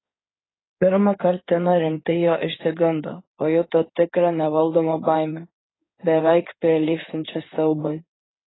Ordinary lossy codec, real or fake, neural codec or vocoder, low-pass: AAC, 16 kbps; fake; codec, 16 kHz in and 24 kHz out, 2.2 kbps, FireRedTTS-2 codec; 7.2 kHz